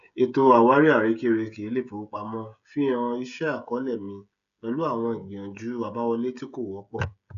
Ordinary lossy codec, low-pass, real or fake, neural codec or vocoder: none; 7.2 kHz; fake; codec, 16 kHz, 16 kbps, FreqCodec, smaller model